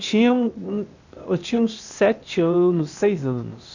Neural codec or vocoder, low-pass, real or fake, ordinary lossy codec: codec, 16 kHz, 0.8 kbps, ZipCodec; 7.2 kHz; fake; none